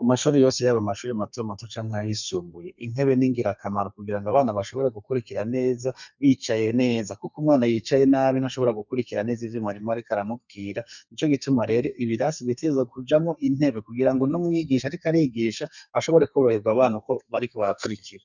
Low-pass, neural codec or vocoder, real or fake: 7.2 kHz; codec, 32 kHz, 1.9 kbps, SNAC; fake